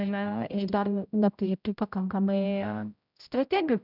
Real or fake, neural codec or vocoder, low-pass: fake; codec, 16 kHz, 0.5 kbps, X-Codec, HuBERT features, trained on general audio; 5.4 kHz